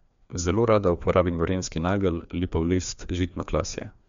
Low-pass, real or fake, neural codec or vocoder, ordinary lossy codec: 7.2 kHz; fake; codec, 16 kHz, 2 kbps, FreqCodec, larger model; MP3, 64 kbps